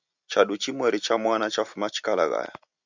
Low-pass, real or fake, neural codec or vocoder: 7.2 kHz; real; none